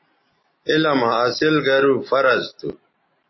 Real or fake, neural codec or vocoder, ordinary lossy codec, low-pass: real; none; MP3, 24 kbps; 7.2 kHz